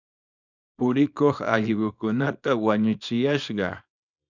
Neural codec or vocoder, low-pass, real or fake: codec, 24 kHz, 0.9 kbps, WavTokenizer, small release; 7.2 kHz; fake